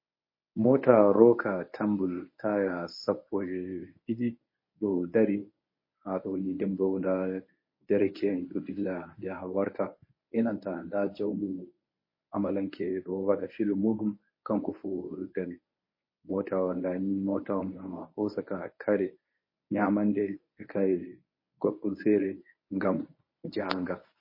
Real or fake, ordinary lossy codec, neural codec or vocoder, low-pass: fake; MP3, 24 kbps; codec, 24 kHz, 0.9 kbps, WavTokenizer, medium speech release version 1; 5.4 kHz